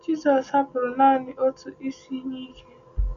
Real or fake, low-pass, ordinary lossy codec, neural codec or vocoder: real; 7.2 kHz; none; none